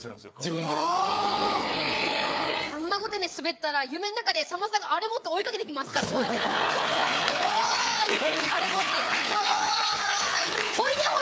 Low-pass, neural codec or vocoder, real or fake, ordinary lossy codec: none; codec, 16 kHz, 4 kbps, FreqCodec, larger model; fake; none